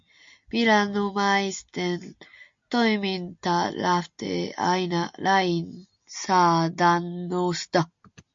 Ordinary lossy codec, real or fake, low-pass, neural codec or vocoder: MP3, 48 kbps; real; 7.2 kHz; none